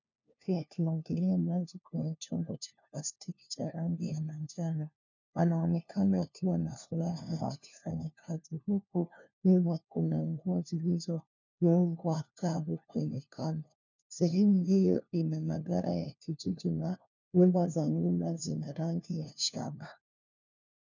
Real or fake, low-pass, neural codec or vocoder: fake; 7.2 kHz; codec, 16 kHz, 1 kbps, FunCodec, trained on LibriTTS, 50 frames a second